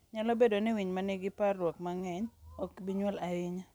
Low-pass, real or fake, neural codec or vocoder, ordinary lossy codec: none; real; none; none